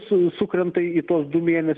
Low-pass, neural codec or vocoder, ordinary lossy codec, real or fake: 9.9 kHz; none; Opus, 32 kbps; real